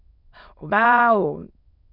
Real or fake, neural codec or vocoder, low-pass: fake; autoencoder, 22.05 kHz, a latent of 192 numbers a frame, VITS, trained on many speakers; 5.4 kHz